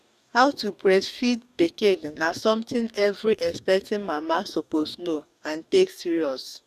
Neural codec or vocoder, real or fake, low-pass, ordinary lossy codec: codec, 44.1 kHz, 2.6 kbps, DAC; fake; 14.4 kHz; none